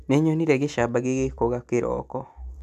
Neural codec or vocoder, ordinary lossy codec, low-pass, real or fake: none; none; 14.4 kHz; real